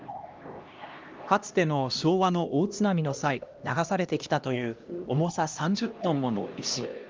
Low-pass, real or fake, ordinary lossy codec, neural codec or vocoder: 7.2 kHz; fake; Opus, 24 kbps; codec, 16 kHz, 1 kbps, X-Codec, HuBERT features, trained on LibriSpeech